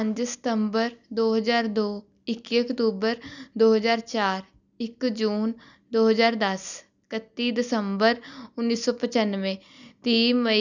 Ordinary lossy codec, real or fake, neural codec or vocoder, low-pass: none; real; none; 7.2 kHz